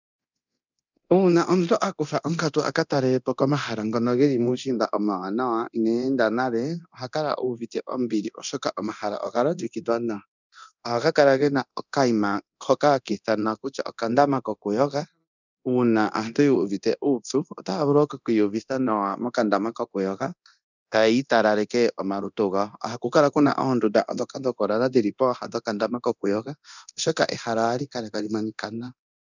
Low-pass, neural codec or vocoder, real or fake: 7.2 kHz; codec, 24 kHz, 0.9 kbps, DualCodec; fake